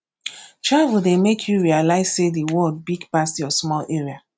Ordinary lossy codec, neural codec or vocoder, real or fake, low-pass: none; none; real; none